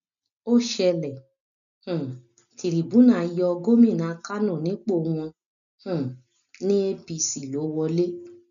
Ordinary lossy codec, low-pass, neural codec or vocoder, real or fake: none; 7.2 kHz; none; real